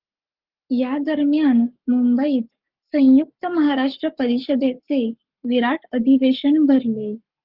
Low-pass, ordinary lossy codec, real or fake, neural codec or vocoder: 5.4 kHz; Opus, 32 kbps; fake; codec, 44.1 kHz, 7.8 kbps, Pupu-Codec